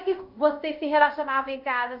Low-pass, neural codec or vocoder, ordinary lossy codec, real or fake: 5.4 kHz; codec, 24 kHz, 0.5 kbps, DualCodec; none; fake